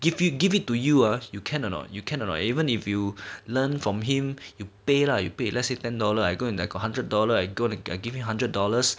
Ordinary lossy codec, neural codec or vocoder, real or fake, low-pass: none; none; real; none